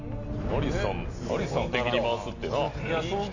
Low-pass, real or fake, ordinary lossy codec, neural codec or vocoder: 7.2 kHz; real; none; none